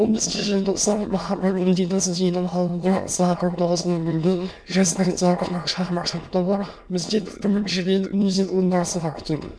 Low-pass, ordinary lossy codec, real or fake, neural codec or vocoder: none; none; fake; autoencoder, 22.05 kHz, a latent of 192 numbers a frame, VITS, trained on many speakers